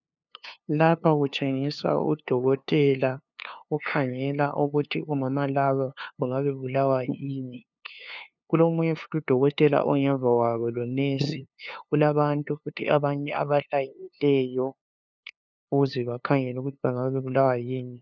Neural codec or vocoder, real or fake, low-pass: codec, 16 kHz, 2 kbps, FunCodec, trained on LibriTTS, 25 frames a second; fake; 7.2 kHz